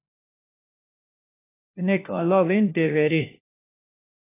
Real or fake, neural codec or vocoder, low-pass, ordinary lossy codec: fake; codec, 16 kHz, 1 kbps, FunCodec, trained on LibriTTS, 50 frames a second; 3.6 kHz; AAC, 24 kbps